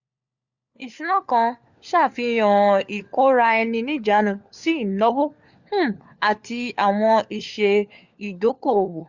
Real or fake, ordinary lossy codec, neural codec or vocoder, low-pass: fake; Opus, 64 kbps; codec, 16 kHz, 4 kbps, FunCodec, trained on LibriTTS, 50 frames a second; 7.2 kHz